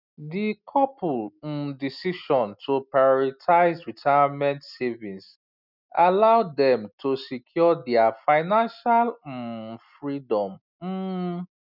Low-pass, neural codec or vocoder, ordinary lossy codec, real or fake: 5.4 kHz; none; none; real